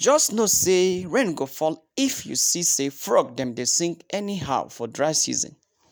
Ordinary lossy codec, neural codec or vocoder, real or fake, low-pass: none; none; real; none